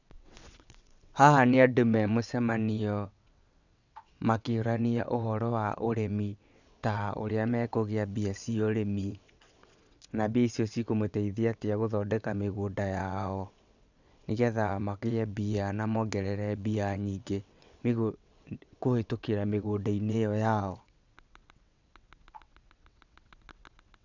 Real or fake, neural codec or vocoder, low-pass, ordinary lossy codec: fake; vocoder, 22.05 kHz, 80 mel bands, WaveNeXt; 7.2 kHz; none